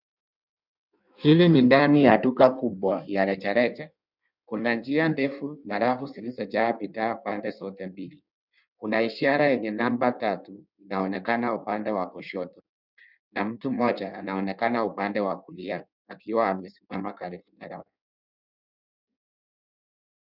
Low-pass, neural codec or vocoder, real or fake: 5.4 kHz; codec, 16 kHz in and 24 kHz out, 1.1 kbps, FireRedTTS-2 codec; fake